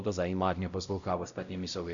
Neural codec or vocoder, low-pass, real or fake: codec, 16 kHz, 0.5 kbps, X-Codec, WavLM features, trained on Multilingual LibriSpeech; 7.2 kHz; fake